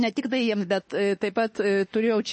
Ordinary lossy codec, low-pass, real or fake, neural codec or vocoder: MP3, 32 kbps; 10.8 kHz; fake; autoencoder, 48 kHz, 32 numbers a frame, DAC-VAE, trained on Japanese speech